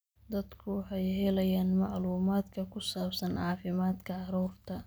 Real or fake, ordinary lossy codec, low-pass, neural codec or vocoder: real; none; none; none